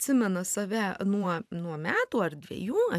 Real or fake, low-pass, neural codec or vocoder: fake; 14.4 kHz; vocoder, 44.1 kHz, 128 mel bands every 512 samples, BigVGAN v2